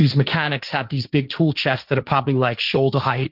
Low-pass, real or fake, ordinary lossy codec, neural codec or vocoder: 5.4 kHz; fake; Opus, 32 kbps; codec, 16 kHz, 1.1 kbps, Voila-Tokenizer